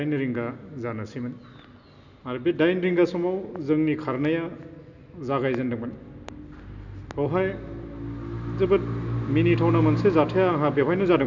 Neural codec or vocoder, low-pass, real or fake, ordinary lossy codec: none; 7.2 kHz; real; none